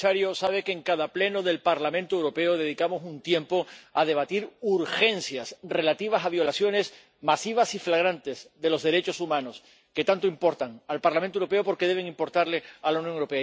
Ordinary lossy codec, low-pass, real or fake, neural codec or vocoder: none; none; real; none